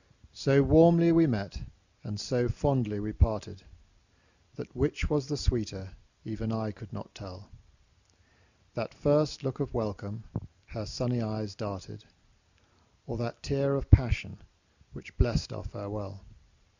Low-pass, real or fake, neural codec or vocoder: 7.2 kHz; real; none